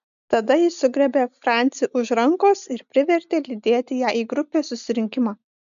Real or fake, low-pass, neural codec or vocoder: real; 7.2 kHz; none